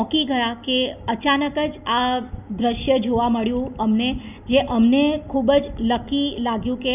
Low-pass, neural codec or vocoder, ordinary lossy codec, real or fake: 3.6 kHz; none; none; real